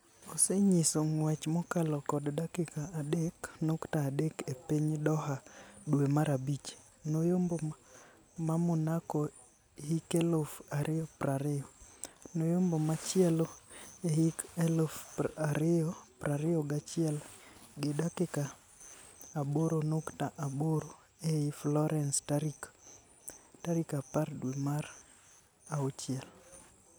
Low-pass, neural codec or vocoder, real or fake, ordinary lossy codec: none; none; real; none